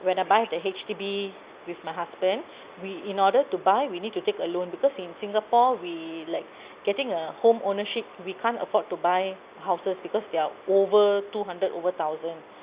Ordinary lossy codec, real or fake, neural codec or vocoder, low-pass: Opus, 64 kbps; real; none; 3.6 kHz